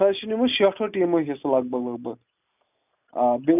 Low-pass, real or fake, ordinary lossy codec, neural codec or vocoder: 3.6 kHz; real; none; none